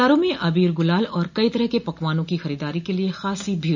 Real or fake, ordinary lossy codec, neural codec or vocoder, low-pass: real; none; none; 7.2 kHz